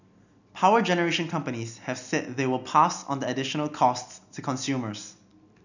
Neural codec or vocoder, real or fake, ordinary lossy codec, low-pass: none; real; none; 7.2 kHz